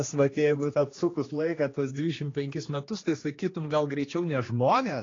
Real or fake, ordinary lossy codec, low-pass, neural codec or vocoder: fake; AAC, 32 kbps; 7.2 kHz; codec, 16 kHz, 2 kbps, X-Codec, HuBERT features, trained on general audio